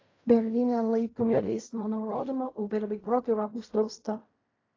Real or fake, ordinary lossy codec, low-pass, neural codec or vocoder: fake; AAC, 32 kbps; 7.2 kHz; codec, 16 kHz in and 24 kHz out, 0.4 kbps, LongCat-Audio-Codec, fine tuned four codebook decoder